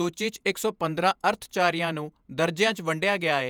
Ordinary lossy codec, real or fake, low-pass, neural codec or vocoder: none; fake; none; vocoder, 48 kHz, 128 mel bands, Vocos